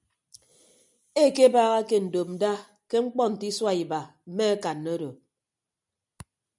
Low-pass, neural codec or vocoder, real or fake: 10.8 kHz; none; real